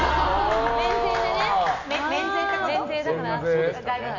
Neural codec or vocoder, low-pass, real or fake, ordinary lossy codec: none; 7.2 kHz; real; none